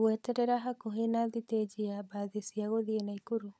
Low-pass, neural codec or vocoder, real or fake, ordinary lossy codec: none; codec, 16 kHz, 16 kbps, FunCodec, trained on LibriTTS, 50 frames a second; fake; none